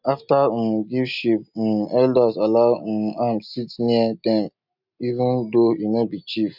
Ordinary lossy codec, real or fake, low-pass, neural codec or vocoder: none; real; 5.4 kHz; none